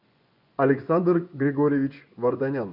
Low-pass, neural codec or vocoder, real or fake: 5.4 kHz; vocoder, 24 kHz, 100 mel bands, Vocos; fake